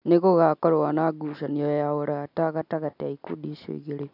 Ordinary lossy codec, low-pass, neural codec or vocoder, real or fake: MP3, 48 kbps; 5.4 kHz; none; real